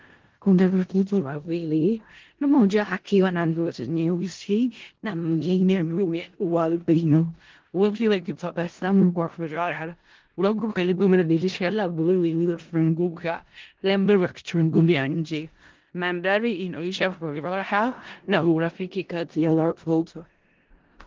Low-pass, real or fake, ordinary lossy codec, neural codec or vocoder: 7.2 kHz; fake; Opus, 16 kbps; codec, 16 kHz in and 24 kHz out, 0.4 kbps, LongCat-Audio-Codec, four codebook decoder